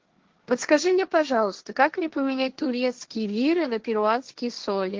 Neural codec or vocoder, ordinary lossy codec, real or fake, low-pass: codec, 16 kHz, 1.1 kbps, Voila-Tokenizer; Opus, 16 kbps; fake; 7.2 kHz